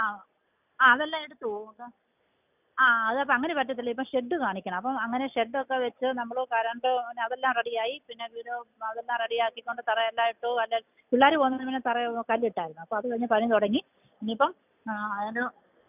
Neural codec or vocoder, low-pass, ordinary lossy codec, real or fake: none; 3.6 kHz; none; real